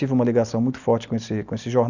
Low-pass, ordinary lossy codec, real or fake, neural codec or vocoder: 7.2 kHz; none; real; none